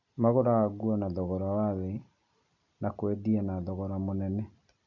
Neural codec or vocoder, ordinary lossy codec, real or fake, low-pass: none; none; real; 7.2 kHz